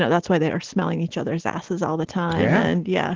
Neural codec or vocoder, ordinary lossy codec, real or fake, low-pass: none; Opus, 16 kbps; real; 7.2 kHz